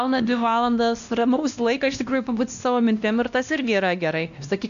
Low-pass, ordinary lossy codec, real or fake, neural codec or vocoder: 7.2 kHz; MP3, 96 kbps; fake; codec, 16 kHz, 1 kbps, X-Codec, WavLM features, trained on Multilingual LibriSpeech